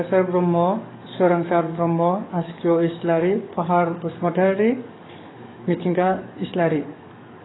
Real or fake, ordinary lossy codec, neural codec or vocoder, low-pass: fake; AAC, 16 kbps; codec, 44.1 kHz, 7.8 kbps, DAC; 7.2 kHz